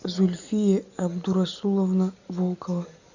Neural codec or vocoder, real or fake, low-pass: none; real; 7.2 kHz